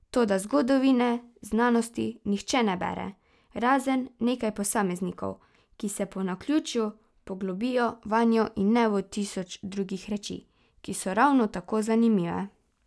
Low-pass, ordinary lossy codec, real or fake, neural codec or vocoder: none; none; real; none